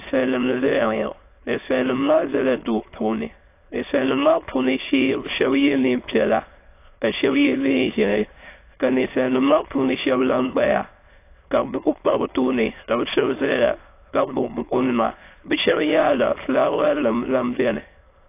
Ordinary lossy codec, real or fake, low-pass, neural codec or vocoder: AAC, 24 kbps; fake; 3.6 kHz; autoencoder, 22.05 kHz, a latent of 192 numbers a frame, VITS, trained on many speakers